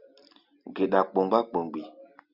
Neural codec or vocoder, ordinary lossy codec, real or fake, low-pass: none; Opus, 64 kbps; real; 5.4 kHz